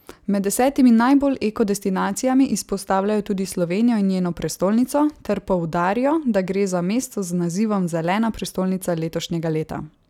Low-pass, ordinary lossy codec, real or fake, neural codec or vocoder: 19.8 kHz; none; real; none